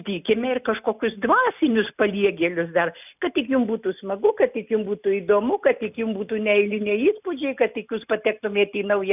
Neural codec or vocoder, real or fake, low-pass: none; real; 3.6 kHz